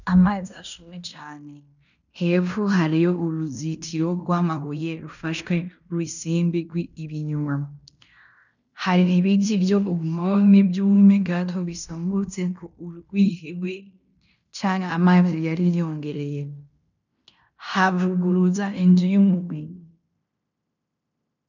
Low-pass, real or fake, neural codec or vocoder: 7.2 kHz; fake; codec, 16 kHz in and 24 kHz out, 0.9 kbps, LongCat-Audio-Codec, fine tuned four codebook decoder